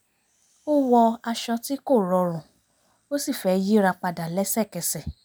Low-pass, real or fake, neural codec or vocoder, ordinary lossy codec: none; real; none; none